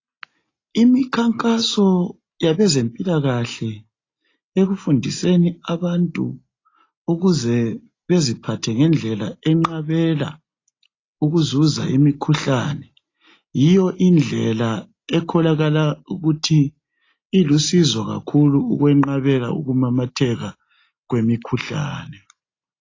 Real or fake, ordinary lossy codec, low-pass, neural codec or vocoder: real; AAC, 32 kbps; 7.2 kHz; none